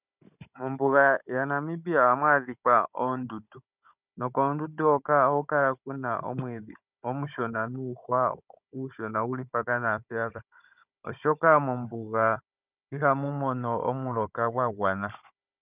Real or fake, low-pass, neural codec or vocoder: fake; 3.6 kHz; codec, 16 kHz, 16 kbps, FunCodec, trained on Chinese and English, 50 frames a second